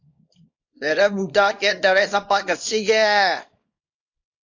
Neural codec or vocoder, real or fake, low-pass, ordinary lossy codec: codec, 24 kHz, 0.9 kbps, WavTokenizer, small release; fake; 7.2 kHz; AAC, 48 kbps